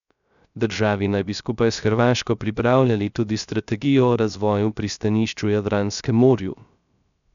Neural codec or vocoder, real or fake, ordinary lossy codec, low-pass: codec, 16 kHz, 0.3 kbps, FocalCodec; fake; none; 7.2 kHz